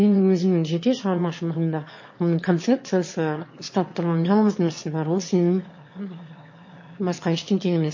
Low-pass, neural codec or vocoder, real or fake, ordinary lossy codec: 7.2 kHz; autoencoder, 22.05 kHz, a latent of 192 numbers a frame, VITS, trained on one speaker; fake; MP3, 32 kbps